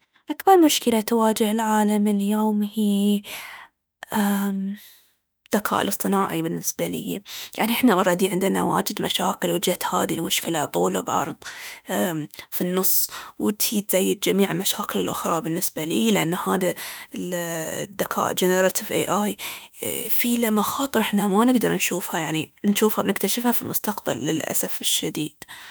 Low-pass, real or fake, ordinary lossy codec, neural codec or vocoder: none; fake; none; autoencoder, 48 kHz, 32 numbers a frame, DAC-VAE, trained on Japanese speech